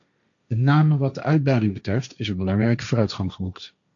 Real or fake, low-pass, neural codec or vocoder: fake; 7.2 kHz; codec, 16 kHz, 1.1 kbps, Voila-Tokenizer